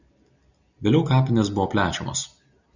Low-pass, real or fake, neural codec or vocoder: 7.2 kHz; real; none